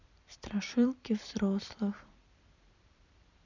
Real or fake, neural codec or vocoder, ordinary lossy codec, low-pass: fake; vocoder, 44.1 kHz, 128 mel bands every 256 samples, BigVGAN v2; none; 7.2 kHz